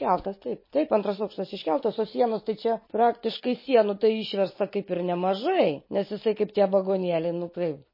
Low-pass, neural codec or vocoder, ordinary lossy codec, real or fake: 5.4 kHz; none; MP3, 24 kbps; real